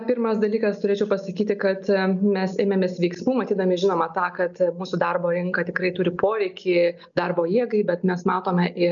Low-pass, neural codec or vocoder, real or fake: 7.2 kHz; none; real